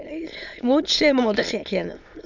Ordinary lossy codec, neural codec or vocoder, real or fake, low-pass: none; autoencoder, 22.05 kHz, a latent of 192 numbers a frame, VITS, trained on many speakers; fake; 7.2 kHz